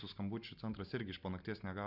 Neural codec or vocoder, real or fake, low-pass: none; real; 5.4 kHz